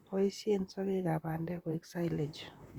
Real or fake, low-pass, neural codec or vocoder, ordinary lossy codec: fake; 19.8 kHz; vocoder, 44.1 kHz, 128 mel bands, Pupu-Vocoder; Opus, 64 kbps